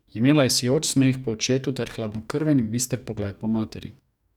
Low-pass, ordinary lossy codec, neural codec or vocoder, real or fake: 19.8 kHz; none; codec, 44.1 kHz, 2.6 kbps, DAC; fake